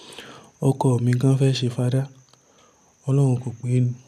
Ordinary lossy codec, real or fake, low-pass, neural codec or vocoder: none; real; 14.4 kHz; none